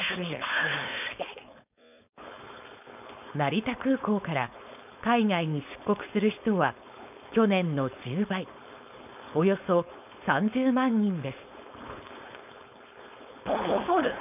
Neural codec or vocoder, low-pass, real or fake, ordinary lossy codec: codec, 16 kHz, 4.8 kbps, FACodec; 3.6 kHz; fake; none